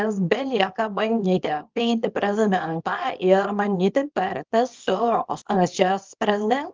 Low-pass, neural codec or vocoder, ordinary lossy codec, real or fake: 7.2 kHz; codec, 24 kHz, 0.9 kbps, WavTokenizer, small release; Opus, 24 kbps; fake